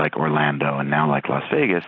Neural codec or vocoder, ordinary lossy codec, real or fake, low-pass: none; AAC, 32 kbps; real; 7.2 kHz